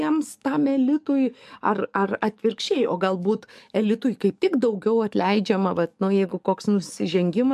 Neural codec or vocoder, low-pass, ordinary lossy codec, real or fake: codec, 44.1 kHz, 7.8 kbps, DAC; 14.4 kHz; MP3, 96 kbps; fake